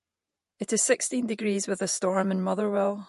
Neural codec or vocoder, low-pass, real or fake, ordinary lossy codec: vocoder, 48 kHz, 128 mel bands, Vocos; 14.4 kHz; fake; MP3, 48 kbps